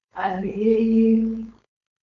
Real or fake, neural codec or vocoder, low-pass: fake; codec, 16 kHz, 4.8 kbps, FACodec; 7.2 kHz